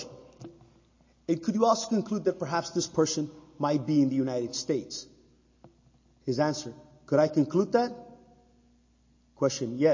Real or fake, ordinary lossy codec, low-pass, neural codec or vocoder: real; MP3, 32 kbps; 7.2 kHz; none